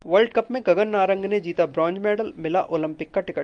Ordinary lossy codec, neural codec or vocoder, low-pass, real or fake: Opus, 32 kbps; none; 9.9 kHz; real